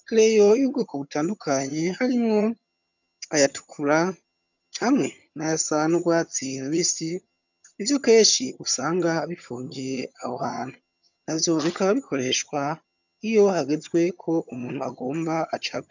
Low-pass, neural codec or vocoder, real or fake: 7.2 kHz; vocoder, 22.05 kHz, 80 mel bands, HiFi-GAN; fake